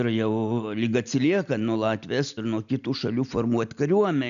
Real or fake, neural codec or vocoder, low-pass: real; none; 7.2 kHz